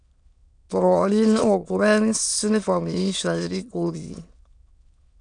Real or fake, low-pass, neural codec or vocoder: fake; 9.9 kHz; autoencoder, 22.05 kHz, a latent of 192 numbers a frame, VITS, trained on many speakers